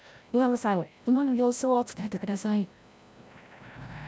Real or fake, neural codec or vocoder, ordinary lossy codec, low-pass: fake; codec, 16 kHz, 0.5 kbps, FreqCodec, larger model; none; none